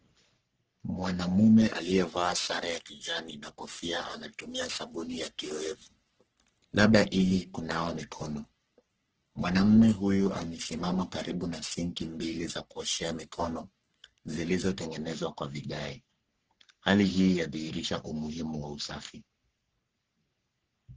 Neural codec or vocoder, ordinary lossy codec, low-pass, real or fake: codec, 44.1 kHz, 3.4 kbps, Pupu-Codec; Opus, 16 kbps; 7.2 kHz; fake